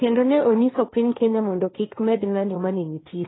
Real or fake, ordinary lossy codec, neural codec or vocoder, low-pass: fake; AAC, 16 kbps; codec, 16 kHz, 1.1 kbps, Voila-Tokenizer; 7.2 kHz